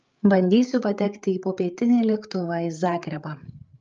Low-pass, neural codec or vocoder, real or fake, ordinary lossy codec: 7.2 kHz; codec, 16 kHz, 8 kbps, FreqCodec, larger model; fake; Opus, 24 kbps